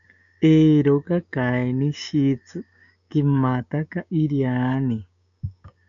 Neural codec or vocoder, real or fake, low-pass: codec, 16 kHz, 6 kbps, DAC; fake; 7.2 kHz